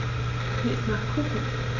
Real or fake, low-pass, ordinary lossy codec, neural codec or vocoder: real; 7.2 kHz; none; none